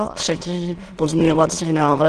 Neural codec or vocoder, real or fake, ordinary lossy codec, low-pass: autoencoder, 22.05 kHz, a latent of 192 numbers a frame, VITS, trained on many speakers; fake; Opus, 16 kbps; 9.9 kHz